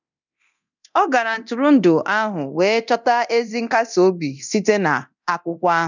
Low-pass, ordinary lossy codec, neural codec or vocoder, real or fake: 7.2 kHz; none; codec, 24 kHz, 0.9 kbps, DualCodec; fake